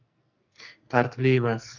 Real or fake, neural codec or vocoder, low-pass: fake; codec, 44.1 kHz, 2.6 kbps, SNAC; 7.2 kHz